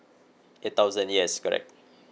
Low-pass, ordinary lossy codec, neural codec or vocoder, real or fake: none; none; none; real